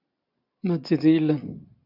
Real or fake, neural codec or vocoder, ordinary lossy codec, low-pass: real; none; AAC, 48 kbps; 5.4 kHz